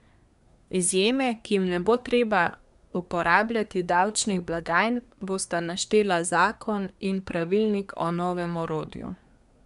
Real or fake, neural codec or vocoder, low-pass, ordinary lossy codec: fake; codec, 24 kHz, 1 kbps, SNAC; 10.8 kHz; none